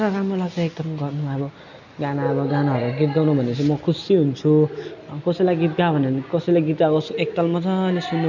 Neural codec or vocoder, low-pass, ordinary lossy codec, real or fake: none; 7.2 kHz; none; real